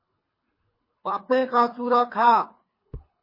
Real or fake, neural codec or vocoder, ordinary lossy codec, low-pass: fake; codec, 24 kHz, 3 kbps, HILCodec; MP3, 24 kbps; 5.4 kHz